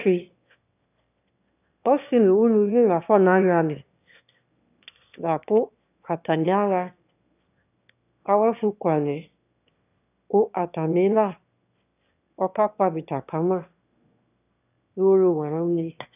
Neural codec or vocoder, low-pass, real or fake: autoencoder, 22.05 kHz, a latent of 192 numbers a frame, VITS, trained on one speaker; 3.6 kHz; fake